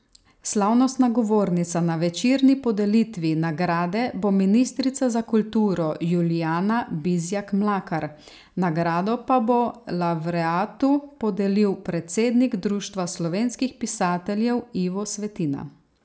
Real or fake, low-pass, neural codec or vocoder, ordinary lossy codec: real; none; none; none